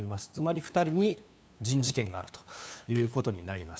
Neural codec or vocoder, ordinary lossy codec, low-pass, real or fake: codec, 16 kHz, 2 kbps, FunCodec, trained on LibriTTS, 25 frames a second; none; none; fake